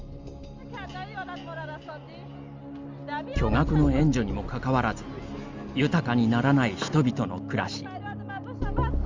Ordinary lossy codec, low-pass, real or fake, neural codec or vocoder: Opus, 32 kbps; 7.2 kHz; real; none